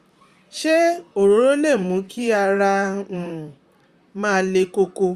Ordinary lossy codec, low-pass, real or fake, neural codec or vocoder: Opus, 64 kbps; 14.4 kHz; fake; vocoder, 44.1 kHz, 128 mel bands, Pupu-Vocoder